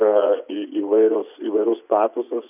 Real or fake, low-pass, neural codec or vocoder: fake; 3.6 kHz; vocoder, 24 kHz, 100 mel bands, Vocos